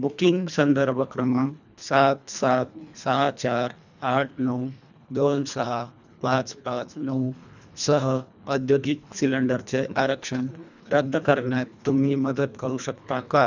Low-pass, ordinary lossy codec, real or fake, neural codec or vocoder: 7.2 kHz; none; fake; codec, 24 kHz, 1.5 kbps, HILCodec